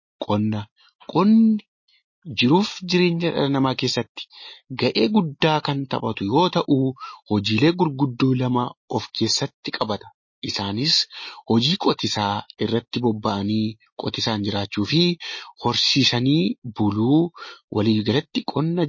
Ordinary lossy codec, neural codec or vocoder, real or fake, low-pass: MP3, 32 kbps; none; real; 7.2 kHz